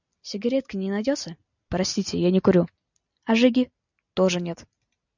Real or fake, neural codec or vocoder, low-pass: real; none; 7.2 kHz